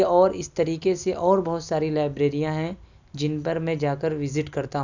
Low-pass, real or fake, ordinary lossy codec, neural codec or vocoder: 7.2 kHz; real; none; none